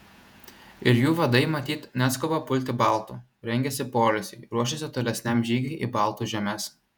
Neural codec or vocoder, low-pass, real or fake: vocoder, 48 kHz, 128 mel bands, Vocos; 19.8 kHz; fake